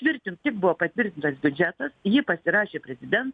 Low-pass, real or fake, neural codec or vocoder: 9.9 kHz; real; none